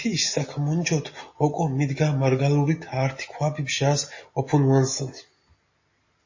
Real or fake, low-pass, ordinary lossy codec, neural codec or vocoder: real; 7.2 kHz; MP3, 32 kbps; none